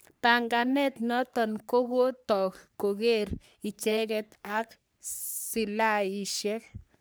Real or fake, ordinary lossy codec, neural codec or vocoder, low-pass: fake; none; codec, 44.1 kHz, 3.4 kbps, Pupu-Codec; none